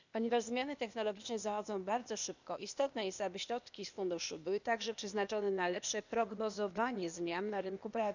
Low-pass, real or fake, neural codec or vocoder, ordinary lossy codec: 7.2 kHz; fake; codec, 16 kHz, 0.8 kbps, ZipCodec; none